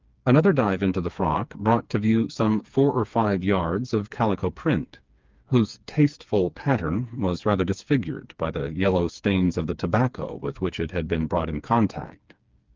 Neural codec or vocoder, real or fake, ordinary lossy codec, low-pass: codec, 16 kHz, 4 kbps, FreqCodec, smaller model; fake; Opus, 24 kbps; 7.2 kHz